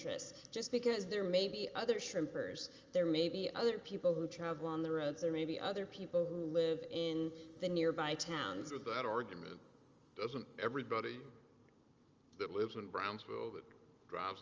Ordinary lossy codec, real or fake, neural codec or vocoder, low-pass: Opus, 32 kbps; real; none; 7.2 kHz